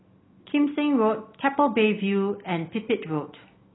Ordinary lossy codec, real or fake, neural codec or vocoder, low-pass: AAC, 16 kbps; real; none; 7.2 kHz